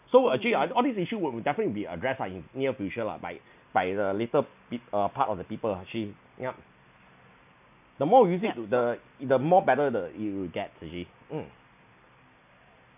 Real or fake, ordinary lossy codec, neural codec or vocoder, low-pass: real; none; none; 3.6 kHz